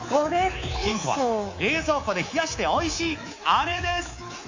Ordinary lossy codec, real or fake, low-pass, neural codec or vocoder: AAC, 48 kbps; fake; 7.2 kHz; codec, 24 kHz, 3.1 kbps, DualCodec